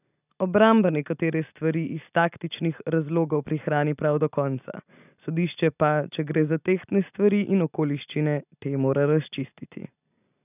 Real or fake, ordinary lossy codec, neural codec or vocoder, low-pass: real; none; none; 3.6 kHz